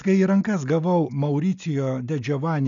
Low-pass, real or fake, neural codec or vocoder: 7.2 kHz; real; none